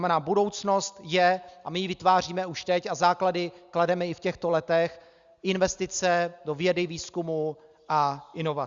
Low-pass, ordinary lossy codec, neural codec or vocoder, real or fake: 7.2 kHz; Opus, 64 kbps; none; real